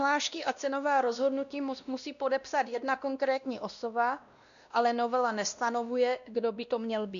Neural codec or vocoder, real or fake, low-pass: codec, 16 kHz, 1 kbps, X-Codec, WavLM features, trained on Multilingual LibriSpeech; fake; 7.2 kHz